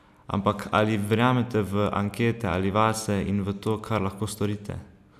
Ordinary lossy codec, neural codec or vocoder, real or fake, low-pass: none; none; real; 14.4 kHz